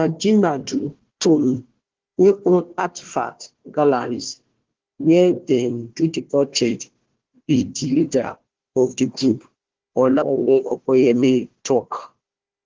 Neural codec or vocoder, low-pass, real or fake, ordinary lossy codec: codec, 16 kHz, 1 kbps, FunCodec, trained on Chinese and English, 50 frames a second; 7.2 kHz; fake; Opus, 16 kbps